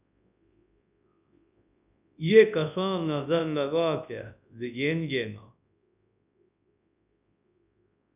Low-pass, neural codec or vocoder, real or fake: 3.6 kHz; codec, 24 kHz, 0.9 kbps, WavTokenizer, large speech release; fake